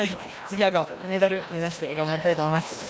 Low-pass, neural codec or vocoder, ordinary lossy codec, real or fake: none; codec, 16 kHz, 1 kbps, FreqCodec, larger model; none; fake